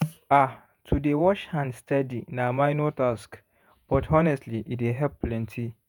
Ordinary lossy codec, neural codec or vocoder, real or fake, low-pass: none; vocoder, 48 kHz, 128 mel bands, Vocos; fake; none